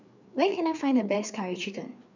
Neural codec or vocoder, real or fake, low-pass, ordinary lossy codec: codec, 16 kHz, 4 kbps, FreqCodec, larger model; fake; 7.2 kHz; none